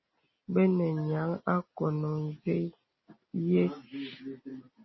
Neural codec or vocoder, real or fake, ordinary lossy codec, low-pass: none; real; MP3, 24 kbps; 7.2 kHz